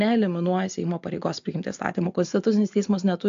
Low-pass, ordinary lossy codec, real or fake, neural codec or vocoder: 7.2 kHz; AAC, 64 kbps; real; none